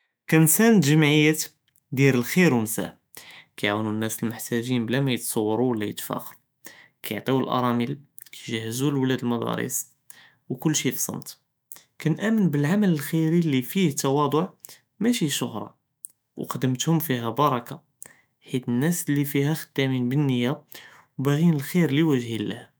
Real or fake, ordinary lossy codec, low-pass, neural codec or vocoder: fake; none; none; autoencoder, 48 kHz, 128 numbers a frame, DAC-VAE, trained on Japanese speech